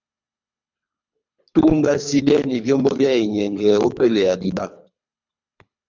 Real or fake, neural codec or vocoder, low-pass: fake; codec, 24 kHz, 3 kbps, HILCodec; 7.2 kHz